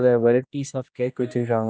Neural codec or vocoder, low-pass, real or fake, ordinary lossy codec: codec, 16 kHz, 1 kbps, X-Codec, HuBERT features, trained on general audio; none; fake; none